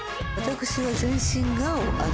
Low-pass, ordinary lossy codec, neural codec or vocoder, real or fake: none; none; none; real